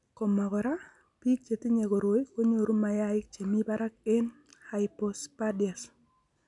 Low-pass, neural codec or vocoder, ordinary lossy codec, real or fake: 10.8 kHz; none; none; real